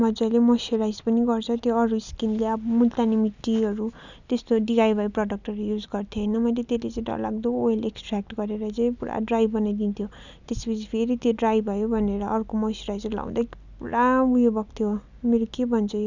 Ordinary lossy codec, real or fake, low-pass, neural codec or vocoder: none; real; 7.2 kHz; none